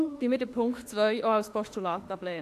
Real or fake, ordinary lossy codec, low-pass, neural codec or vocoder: fake; AAC, 64 kbps; 14.4 kHz; autoencoder, 48 kHz, 32 numbers a frame, DAC-VAE, trained on Japanese speech